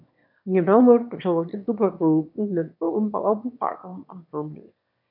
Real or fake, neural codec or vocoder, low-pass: fake; autoencoder, 22.05 kHz, a latent of 192 numbers a frame, VITS, trained on one speaker; 5.4 kHz